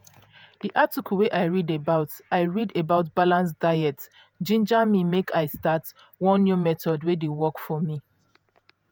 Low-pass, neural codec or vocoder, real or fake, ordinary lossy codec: none; vocoder, 48 kHz, 128 mel bands, Vocos; fake; none